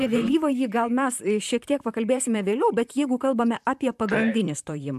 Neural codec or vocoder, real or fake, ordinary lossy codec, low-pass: vocoder, 44.1 kHz, 128 mel bands, Pupu-Vocoder; fake; AAC, 96 kbps; 14.4 kHz